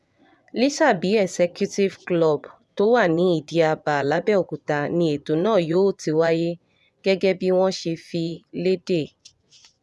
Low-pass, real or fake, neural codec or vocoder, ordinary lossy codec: none; fake; vocoder, 24 kHz, 100 mel bands, Vocos; none